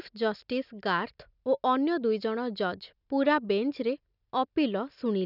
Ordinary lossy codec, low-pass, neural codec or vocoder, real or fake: none; 5.4 kHz; none; real